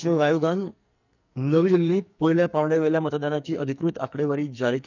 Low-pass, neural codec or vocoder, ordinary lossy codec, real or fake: 7.2 kHz; codec, 44.1 kHz, 2.6 kbps, SNAC; none; fake